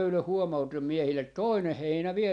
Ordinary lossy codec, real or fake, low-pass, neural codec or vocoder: none; real; 9.9 kHz; none